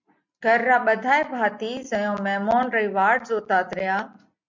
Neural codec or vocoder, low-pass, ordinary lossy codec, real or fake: none; 7.2 kHz; MP3, 64 kbps; real